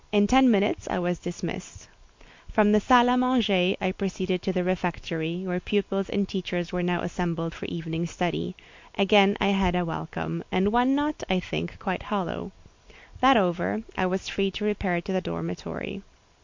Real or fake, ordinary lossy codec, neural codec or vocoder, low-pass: real; MP3, 48 kbps; none; 7.2 kHz